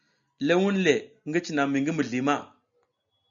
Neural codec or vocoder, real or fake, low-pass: none; real; 7.2 kHz